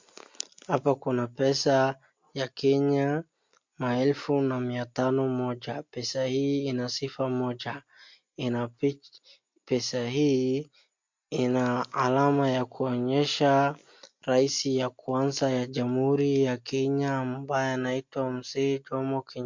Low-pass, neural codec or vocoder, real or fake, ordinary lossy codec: 7.2 kHz; none; real; MP3, 48 kbps